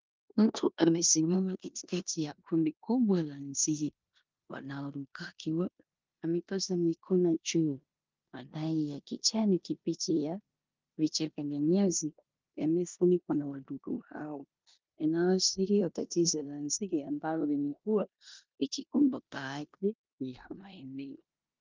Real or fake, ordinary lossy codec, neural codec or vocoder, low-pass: fake; Opus, 32 kbps; codec, 16 kHz in and 24 kHz out, 0.9 kbps, LongCat-Audio-Codec, four codebook decoder; 7.2 kHz